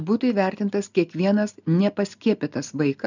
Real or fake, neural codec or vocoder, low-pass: real; none; 7.2 kHz